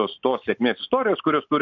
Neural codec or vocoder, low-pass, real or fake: none; 7.2 kHz; real